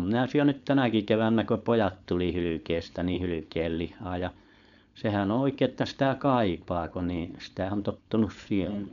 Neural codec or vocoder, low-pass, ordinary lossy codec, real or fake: codec, 16 kHz, 4.8 kbps, FACodec; 7.2 kHz; none; fake